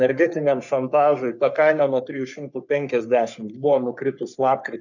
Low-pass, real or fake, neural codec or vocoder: 7.2 kHz; fake; codec, 44.1 kHz, 3.4 kbps, Pupu-Codec